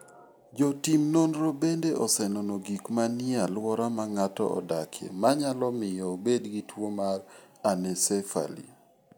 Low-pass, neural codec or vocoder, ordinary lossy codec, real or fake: none; none; none; real